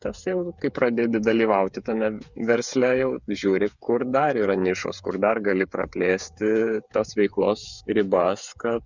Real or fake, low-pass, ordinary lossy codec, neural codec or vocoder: fake; 7.2 kHz; Opus, 64 kbps; codec, 16 kHz, 8 kbps, FreqCodec, smaller model